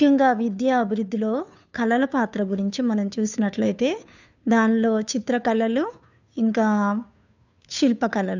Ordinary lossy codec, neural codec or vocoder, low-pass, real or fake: none; codec, 16 kHz, 2 kbps, FunCodec, trained on Chinese and English, 25 frames a second; 7.2 kHz; fake